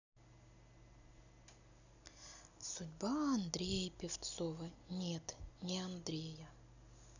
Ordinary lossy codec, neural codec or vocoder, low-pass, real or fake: none; none; 7.2 kHz; real